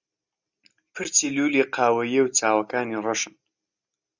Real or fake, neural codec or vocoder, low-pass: real; none; 7.2 kHz